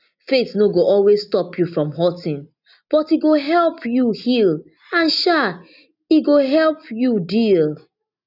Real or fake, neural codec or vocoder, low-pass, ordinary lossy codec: real; none; 5.4 kHz; none